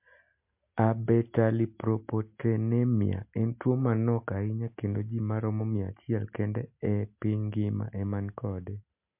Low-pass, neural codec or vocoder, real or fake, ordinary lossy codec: 3.6 kHz; none; real; MP3, 32 kbps